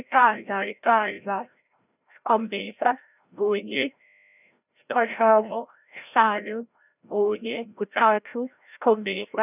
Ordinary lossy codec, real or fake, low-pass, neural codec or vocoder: none; fake; 3.6 kHz; codec, 16 kHz, 0.5 kbps, FreqCodec, larger model